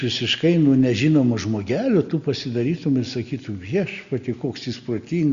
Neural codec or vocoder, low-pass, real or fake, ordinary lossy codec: none; 7.2 kHz; real; Opus, 64 kbps